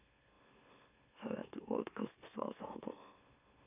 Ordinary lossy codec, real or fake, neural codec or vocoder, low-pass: none; fake; autoencoder, 44.1 kHz, a latent of 192 numbers a frame, MeloTTS; 3.6 kHz